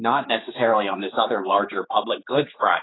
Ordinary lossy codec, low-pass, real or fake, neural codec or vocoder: AAC, 16 kbps; 7.2 kHz; fake; codec, 16 kHz, 4 kbps, X-Codec, HuBERT features, trained on general audio